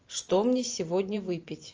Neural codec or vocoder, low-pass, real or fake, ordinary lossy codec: vocoder, 44.1 kHz, 128 mel bands every 512 samples, BigVGAN v2; 7.2 kHz; fake; Opus, 24 kbps